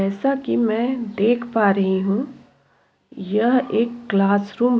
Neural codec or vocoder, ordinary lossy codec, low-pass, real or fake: none; none; none; real